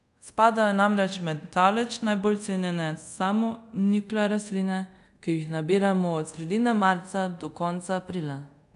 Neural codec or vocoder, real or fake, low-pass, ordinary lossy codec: codec, 24 kHz, 0.5 kbps, DualCodec; fake; 10.8 kHz; none